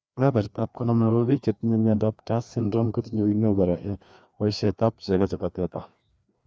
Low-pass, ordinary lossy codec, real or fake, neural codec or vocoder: none; none; fake; codec, 16 kHz, 2 kbps, FreqCodec, larger model